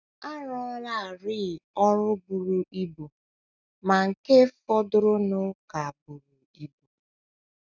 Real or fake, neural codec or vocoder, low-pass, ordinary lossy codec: real; none; 7.2 kHz; none